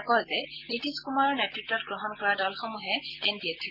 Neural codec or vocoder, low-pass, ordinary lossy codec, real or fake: none; 5.4 kHz; Opus, 32 kbps; real